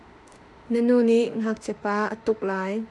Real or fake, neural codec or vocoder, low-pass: fake; autoencoder, 48 kHz, 32 numbers a frame, DAC-VAE, trained on Japanese speech; 10.8 kHz